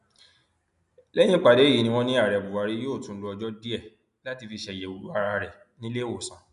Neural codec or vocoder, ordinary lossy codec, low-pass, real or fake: none; none; 10.8 kHz; real